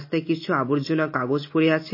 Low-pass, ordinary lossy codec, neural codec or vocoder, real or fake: 5.4 kHz; MP3, 24 kbps; none; real